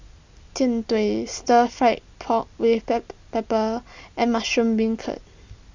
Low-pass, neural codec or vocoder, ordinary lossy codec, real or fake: 7.2 kHz; none; Opus, 64 kbps; real